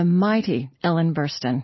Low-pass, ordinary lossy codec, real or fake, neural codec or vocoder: 7.2 kHz; MP3, 24 kbps; real; none